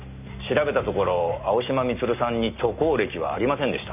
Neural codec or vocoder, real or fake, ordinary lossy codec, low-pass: none; real; none; 3.6 kHz